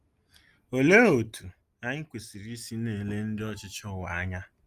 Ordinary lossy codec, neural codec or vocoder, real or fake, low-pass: Opus, 32 kbps; none; real; 14.4 kHz